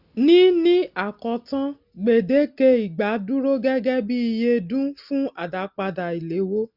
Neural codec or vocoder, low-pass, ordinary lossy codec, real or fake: none; 5.4 kHz; none; real